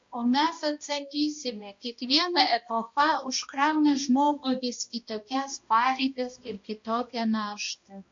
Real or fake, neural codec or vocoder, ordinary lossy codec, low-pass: fake; codec, 16 kHz, 1 kbps, X-Codec, HuBERT features, trained on balanced general audio; MP3, 48 kbps; 7.2 kHz